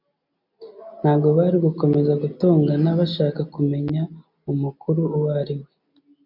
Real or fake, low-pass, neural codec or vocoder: real; 5.4 kHz; none